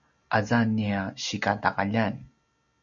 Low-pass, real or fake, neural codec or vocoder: 7.2 kHz; real; none